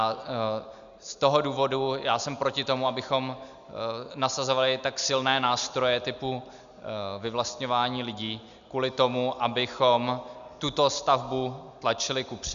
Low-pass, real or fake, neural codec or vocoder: 7.2 kHz; real; none